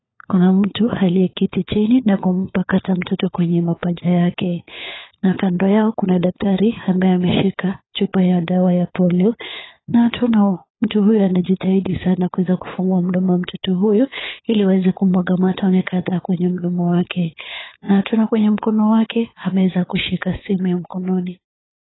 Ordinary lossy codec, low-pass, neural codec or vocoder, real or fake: AAC, 16 kbps; 7.2 kHz; codec, 16 kHz, 4 kbps, FunCodec, trained on LibriTTS, 50 frames a second; fake